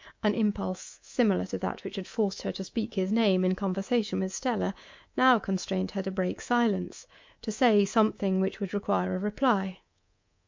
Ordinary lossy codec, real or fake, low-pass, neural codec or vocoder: MP3, 48 kbps; fake; 7.2 kHz; codec, 24 kHz, 3.1 kbps, DualCodec